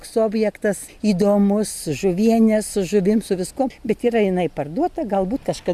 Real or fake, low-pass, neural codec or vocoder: real; 14.4 kHz; none